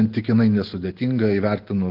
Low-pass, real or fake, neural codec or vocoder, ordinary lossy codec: 5.4 kHz; real; none; Opus, 16 kbps